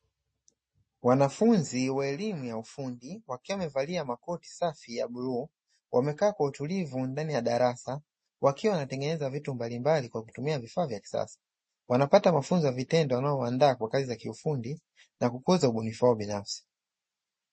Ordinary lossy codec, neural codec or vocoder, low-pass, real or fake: MP3, 32 kbps; none; 10.8 kHz; real